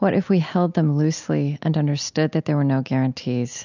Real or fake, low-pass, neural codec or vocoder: real; 7.2 kHz; none